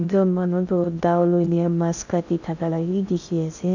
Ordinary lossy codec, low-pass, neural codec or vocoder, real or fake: none; 7.2 kHz; codec, 16 kHz in and 24 kHz out, 0.6 kbps, FocalCodec, streaming, 2048 codes; fake